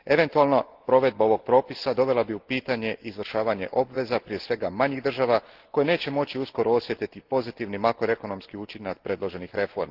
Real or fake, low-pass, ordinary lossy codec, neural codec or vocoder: real; 5.4 kHz; Opus, 16 kbps; none